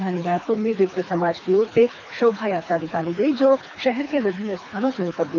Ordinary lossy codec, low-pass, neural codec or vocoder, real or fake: none; 7.2 kHz; codec, 24 kHz, 3 kbps, HILCodec; fake